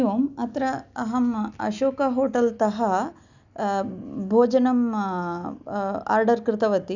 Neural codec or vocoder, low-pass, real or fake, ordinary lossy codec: none; 7.2 kHz; real; none